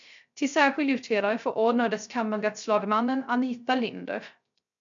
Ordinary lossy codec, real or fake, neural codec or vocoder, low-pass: MP3, 64 kbps; fake; codec, 16 kHz, 0.3 kbps, FocalCodec; 7.2 kHz